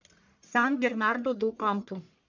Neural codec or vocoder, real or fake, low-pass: codec, 44.1 kHz, 1.7 kbps, Pupu-Codec; fake; 7.2 kHz